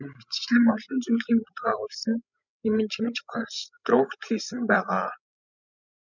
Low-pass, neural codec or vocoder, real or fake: 7.2 kHz; codec, 16 kHz, 16 kbps, FreqCodec, larger model; fake